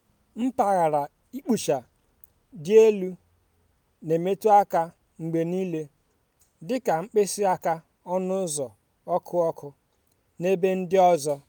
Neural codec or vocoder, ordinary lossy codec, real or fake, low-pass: none; none; real; none